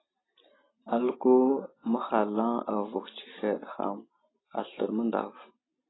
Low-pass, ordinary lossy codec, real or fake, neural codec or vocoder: 7.2 kHz; AAC, 16 kbps; real; none